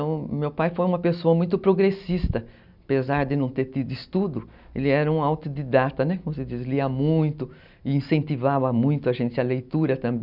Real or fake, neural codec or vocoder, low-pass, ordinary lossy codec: real; none; 5.4 kHz; none